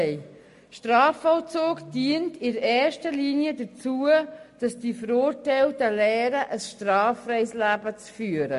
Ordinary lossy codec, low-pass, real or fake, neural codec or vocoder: MP3, 48 kbps; 14.4 kHz; real; none